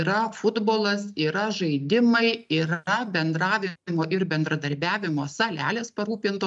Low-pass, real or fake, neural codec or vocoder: 10.8 kHz; real; none